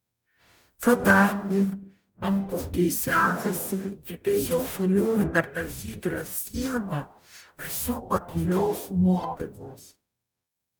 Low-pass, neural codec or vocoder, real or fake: 19.8 kHz; codec, 44.1 kHz, 0.9 kbps, DAC; fake